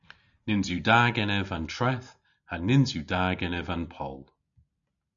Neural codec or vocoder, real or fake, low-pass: none; real; 7.2 kHz